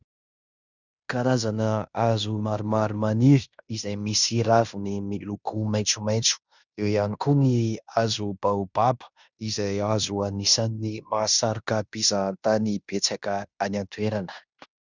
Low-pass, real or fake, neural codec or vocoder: 7.2 kHz; fake; codec, 16 kHz in and 24 kHz out, 0.9 kbps, LongCat-Audio-Codec, fine tuned four codebook decoder